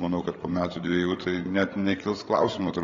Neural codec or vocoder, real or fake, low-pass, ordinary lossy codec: codec, 16 kHz, 8 kbps, FreqCodec, larger model; fake; 7.2 kHz; AAC, 32 kbps